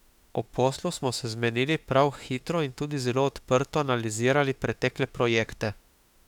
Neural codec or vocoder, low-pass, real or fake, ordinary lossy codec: autoencoder, 48 kHz, 32 numbers a frame, DAC-VAE, trained on Japanese speech; 19.8 kHz; fake; none